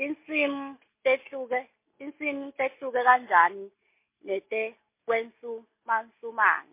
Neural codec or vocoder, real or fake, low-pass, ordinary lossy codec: none; real; 3.6 kHz; MP3, 24 kbps